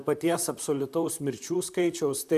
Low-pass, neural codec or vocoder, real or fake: 14.4 kHz; vocoder, 44.1 kHz, 128 mel bands, Pupu-Vocoder; fake